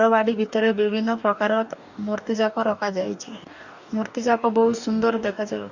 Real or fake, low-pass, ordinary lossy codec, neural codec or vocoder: fake; 7.2 kHz; none; codec, 44.1 kHz, 2.6 kbps, DAC